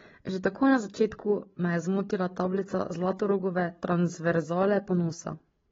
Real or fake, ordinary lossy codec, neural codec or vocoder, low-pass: fake; AAC, 24 kbps; codec, 16 kHz, 8 kbps, FreqCodec, larger model; 7.2 kHz